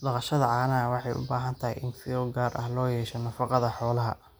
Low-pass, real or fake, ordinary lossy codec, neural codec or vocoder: none; real; none; none